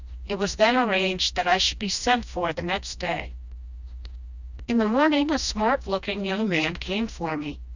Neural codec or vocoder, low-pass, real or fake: codec, 16 kHz, 1 kbps, FreqCodec, smaller model; 7.2 kHz; fake